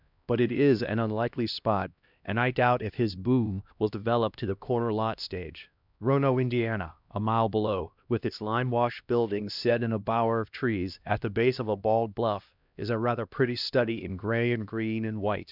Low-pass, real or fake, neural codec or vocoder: 5.4 kHz; fake; codec, 16 kHz, 1 kbps, X-Codec, HuBERT features, trained on LibriSpeech